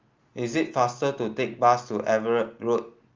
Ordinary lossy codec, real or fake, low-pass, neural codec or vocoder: Opus, 32 kbps; real; 7.2 kHz; none